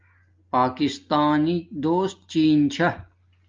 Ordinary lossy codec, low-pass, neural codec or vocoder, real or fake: Opus, 24 kbps; 7.2 kHz; none; real